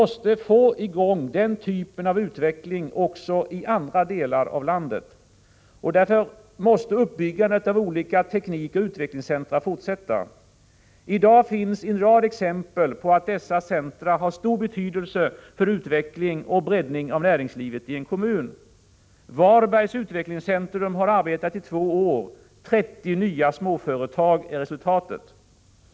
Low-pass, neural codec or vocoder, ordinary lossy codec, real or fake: none; none; none; real